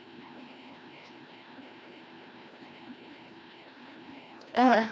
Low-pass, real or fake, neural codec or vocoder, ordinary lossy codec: none; fake; codec, 16 kHz, 1 kbps, FreqCodec, larger model; none